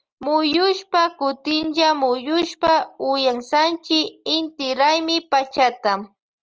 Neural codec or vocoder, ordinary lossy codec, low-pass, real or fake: none; Opus, 24 kbps; 7.2 kHz; real